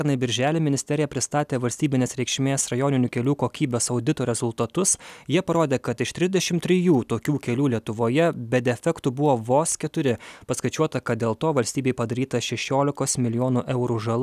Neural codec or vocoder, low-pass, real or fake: none; 14.4 kHz; real